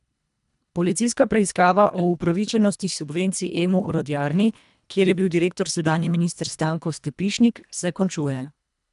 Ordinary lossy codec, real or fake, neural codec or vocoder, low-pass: none; fake; codec, 24 kHz, 1.5 kbps, HILCodec; 10.8 kHz